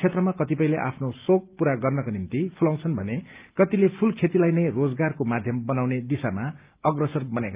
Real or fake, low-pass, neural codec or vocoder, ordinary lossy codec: real; 3.6 kHz; none; Opus, 24 kbps